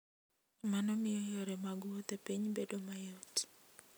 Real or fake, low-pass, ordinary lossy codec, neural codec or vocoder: real; none; none; none